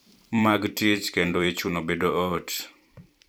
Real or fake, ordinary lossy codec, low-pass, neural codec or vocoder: fake; none; none; vocoder, 44.1 kHz, 128 mel bands every 512 samples, BigVGAN v2